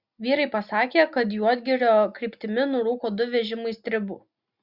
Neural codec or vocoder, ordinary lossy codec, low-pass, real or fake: none; Opus, 64 kbps; 5.4 kHz; real